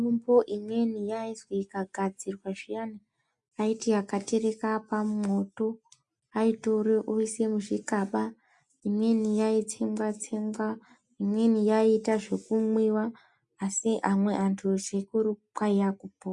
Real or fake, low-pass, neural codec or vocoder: real; 10.8 kHz; none